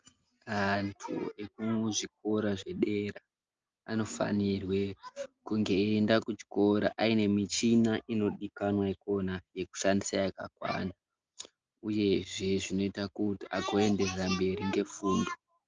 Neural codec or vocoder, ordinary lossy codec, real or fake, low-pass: none; Opus, 24 kbps; real; 7.2 kHz